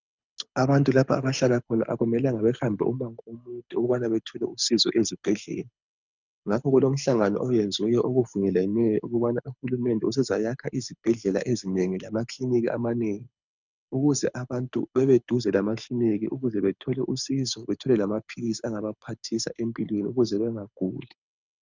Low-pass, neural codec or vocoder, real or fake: 7.2 kHz; codec, 24 kHz, 6 kbps, HILCodec; fake